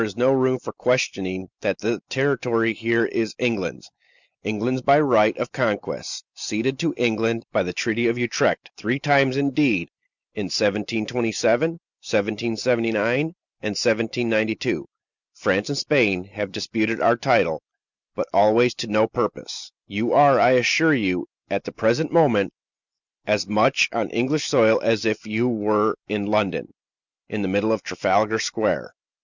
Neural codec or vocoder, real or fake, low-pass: none; real; 7.2 kHz